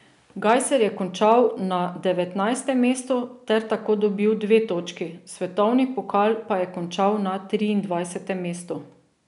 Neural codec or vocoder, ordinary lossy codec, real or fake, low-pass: none; none; real; 10.8 kHz